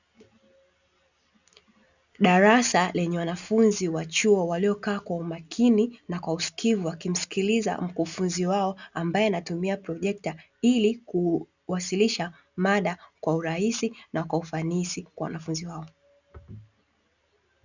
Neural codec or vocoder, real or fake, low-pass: none; real; 7.2 kHz